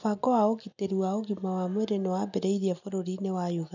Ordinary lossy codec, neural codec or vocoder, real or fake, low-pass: none; none; real; 7.2 kHz